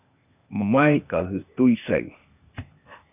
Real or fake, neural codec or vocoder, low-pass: fake; codec, 16 kHz, 0.8 kbps, ZipCodec; 3.6 kHz